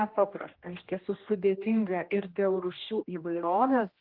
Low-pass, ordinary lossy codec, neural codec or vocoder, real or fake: 5.4 kHz; Opus, 32 kbps; codec, 16 kHz, 1 kbps, X-Codec, HuBERT features, trained on general audio; fake